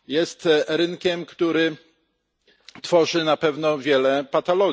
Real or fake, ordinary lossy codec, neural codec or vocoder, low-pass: real; none; none; none